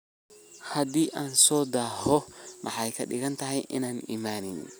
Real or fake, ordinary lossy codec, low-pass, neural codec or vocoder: real; none; none; none